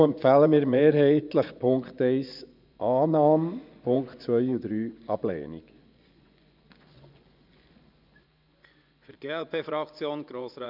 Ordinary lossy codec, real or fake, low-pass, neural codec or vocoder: none; fake; 5.4 kHz; vocoder, 24 kHz, 100 mel bands, Vocos